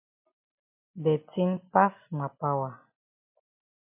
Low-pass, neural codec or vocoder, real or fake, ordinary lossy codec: 3.6 kHz; none; real; MP3, 24 kbps